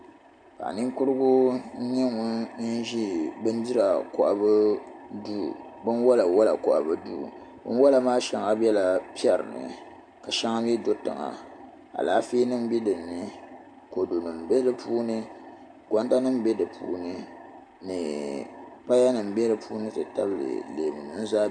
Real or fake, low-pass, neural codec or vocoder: real; 9.9 kHz; none